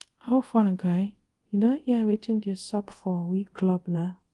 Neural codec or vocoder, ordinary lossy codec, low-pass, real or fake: codec, 24 kHz, 0.5 kbps, DualCodec; Opus, 24 kbps; 10.8 kHz; fake